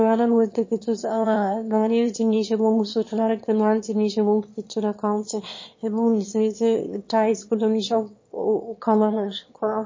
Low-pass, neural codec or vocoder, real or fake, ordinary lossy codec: 7.2 kHz; autoencoder, 22.05 kHz, a latent of 192 numbers a frame, VITS, trained on one speaker; fake; MP3, 32 kbps